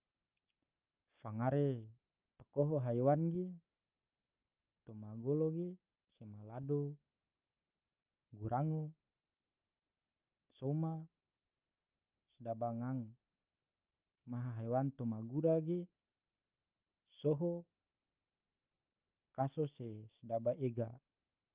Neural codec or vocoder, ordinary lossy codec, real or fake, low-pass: none; Opus, 24 kbps; real; 3.6 kHz